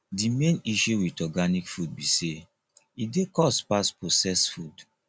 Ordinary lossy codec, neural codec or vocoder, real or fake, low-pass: none; none; real; none